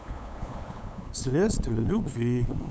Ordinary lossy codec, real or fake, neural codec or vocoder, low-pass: none; fake; codec, 16 kHz, 8 kbps, FunCodec, trained on LibriTTS, 25 frames a second; none